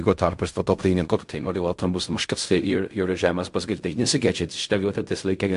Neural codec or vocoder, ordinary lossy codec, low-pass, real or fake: codec, 16 kHz in and 24 kHz out, 0.4 kbps, LongCat-Audio-Codec, fine tuned four codebook decoder; MP3, 64 kbps; 10.8 kHz; fake